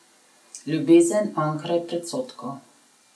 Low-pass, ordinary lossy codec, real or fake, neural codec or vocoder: none; none; real; none